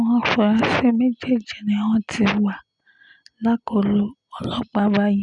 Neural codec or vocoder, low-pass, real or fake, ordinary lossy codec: none; 10.8 kHz; real; none